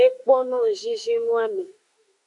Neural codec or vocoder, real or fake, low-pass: autoencoder, 48 kHz, 32 numbers a frame, DAC-VAE, trained on Japanese speech; fake; 10.8 kHz